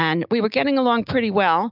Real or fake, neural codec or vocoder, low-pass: real; none; 5.4 kHz